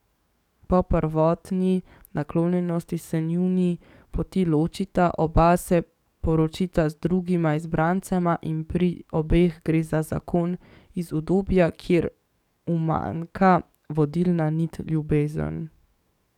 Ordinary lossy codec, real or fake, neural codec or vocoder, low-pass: none; fake; codec, 44.1 kHz, 7.8 kbps, DAC; 19.8 kHz